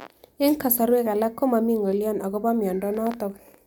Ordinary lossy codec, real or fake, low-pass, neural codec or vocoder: none; real; none; none